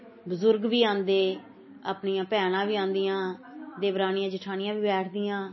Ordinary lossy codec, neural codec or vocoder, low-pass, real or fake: MP3, 24 kbps; none; 7.2 kHz; real